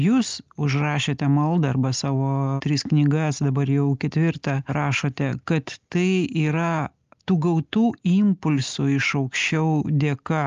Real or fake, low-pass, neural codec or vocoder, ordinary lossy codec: real; 7.2 kHz; none; Opus, 32 kbps